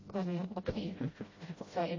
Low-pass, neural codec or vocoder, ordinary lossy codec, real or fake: 7.2 kHz; codec, 16 kHz, 0.5 kbps, FreqCodec, smaller model; MP3, 32 kbps; fake